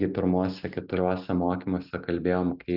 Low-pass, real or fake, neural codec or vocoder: 5.4 kHz; real; none